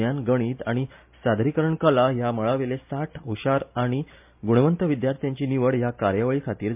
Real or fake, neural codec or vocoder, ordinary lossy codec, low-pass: real; none; MP3, 32 kbps; 3.6 kHz